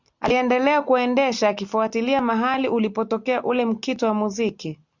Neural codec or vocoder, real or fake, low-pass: none; real; 7.2 kHz